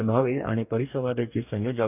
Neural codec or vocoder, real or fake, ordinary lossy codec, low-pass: codec, 44.1 kHz, 2.6 kbps, DAC; fake; none; 3.6 kHz